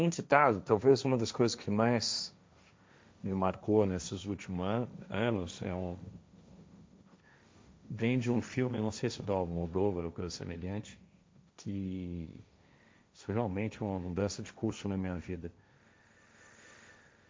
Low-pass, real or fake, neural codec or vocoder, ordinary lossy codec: none; fake; codec, 16 kHz, 1.1 kbps, Voila-Tokenizer; none